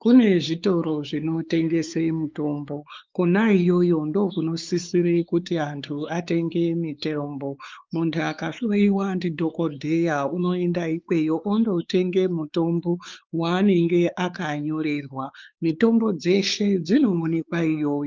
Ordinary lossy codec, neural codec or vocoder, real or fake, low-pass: Opus, 32 kbps; codec, 16 kHz, 4 kbps, X-Codec, WavLM features, trained on Multilingual LibriSpeech; fake; 7.2 kHz